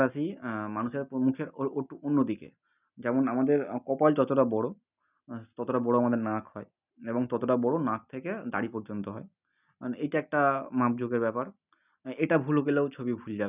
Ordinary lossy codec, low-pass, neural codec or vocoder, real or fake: none; 3.6 kHz; none; real